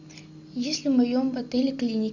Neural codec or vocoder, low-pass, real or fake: none; 7.2 kHz; real